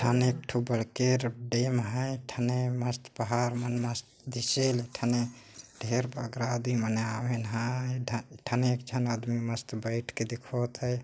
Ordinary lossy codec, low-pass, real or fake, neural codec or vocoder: none; none; real; none